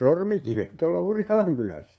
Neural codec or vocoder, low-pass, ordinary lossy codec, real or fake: codec, 16 kHz, 2 kbps, FunCodec, trained on LibriTTS, 25 frames a second; none; none; fake